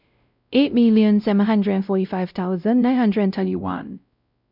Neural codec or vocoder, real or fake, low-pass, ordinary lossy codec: codec, 16 kHz, 0.5 kbps, X-Codec, WavLM features, trained on Multilingual LibriSpeech; fake; 5.4 kHz; none